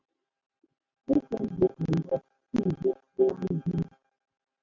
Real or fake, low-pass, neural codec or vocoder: real; 7.2 kHz; none